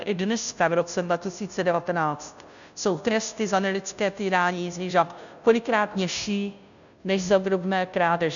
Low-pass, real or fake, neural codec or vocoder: 7.2 kHz; fake; codec, 16 kHz, 0.5 kbps, FunCodec, trained on Chinese and English, 25 frames a second